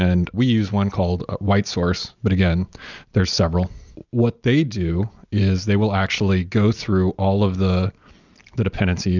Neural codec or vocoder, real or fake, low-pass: none; real; 7.2 kHz